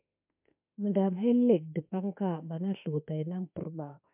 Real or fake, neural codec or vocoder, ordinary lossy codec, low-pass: fake; autoencoder, 48 kHz, 32 numbers a frame, DAC-VAE, trained on Japanese speech; MP3, 32 kbps; 3.6 kHz